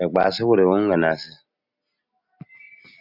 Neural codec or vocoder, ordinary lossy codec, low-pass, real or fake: none; Opus, 64 kbps; 5.4 kHz; real